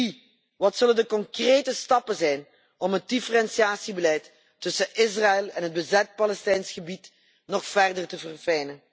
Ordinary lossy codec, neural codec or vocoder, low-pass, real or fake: none; none; none; real